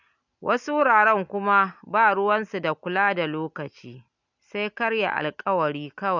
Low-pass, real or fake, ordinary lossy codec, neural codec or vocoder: 7.2 kHz; real; none; none